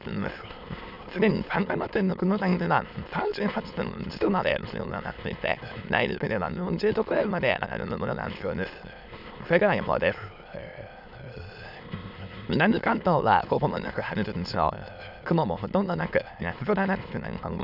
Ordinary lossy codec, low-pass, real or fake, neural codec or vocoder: none; 5.4 kHz; fake; autoencoder, 22.05 kHz, a latent of 192 numbers a frame, VITS, trained on many speakers